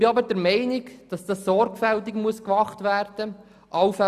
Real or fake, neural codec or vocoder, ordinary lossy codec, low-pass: real; none; none; 14.4 kHz